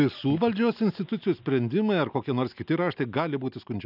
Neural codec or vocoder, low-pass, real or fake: none; 5.4 kHz; real